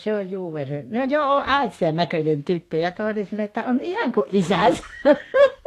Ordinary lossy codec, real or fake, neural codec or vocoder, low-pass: AAC, 64 kbps; fake; codec, 32 kHz, 1.9 kbps, SNAC; 14.4 kHz